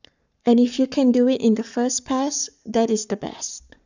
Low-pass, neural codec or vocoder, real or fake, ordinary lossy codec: 7.2 kHz; codec, 44.1 kHz, 7.8 kbps, Pupu-Codec; fake; none